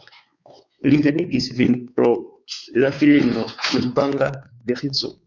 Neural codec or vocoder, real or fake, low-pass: codec, 16 kHz, 4 kbps, X-Codec, WavLM features, trained on Multilingual LibriSpeech; fake; 7.2 kHz